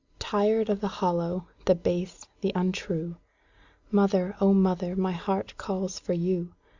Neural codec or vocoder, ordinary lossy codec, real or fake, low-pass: none; Opus, 64 kbps; real; 7.2 kHz